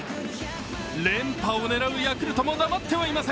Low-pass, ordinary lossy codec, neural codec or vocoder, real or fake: none; none; none; real